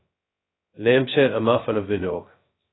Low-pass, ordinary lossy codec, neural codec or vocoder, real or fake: 7.2 kHz; AAC, 16 kbps; codec, 16 kHz, 0.2 kbps, FocalCodec; fake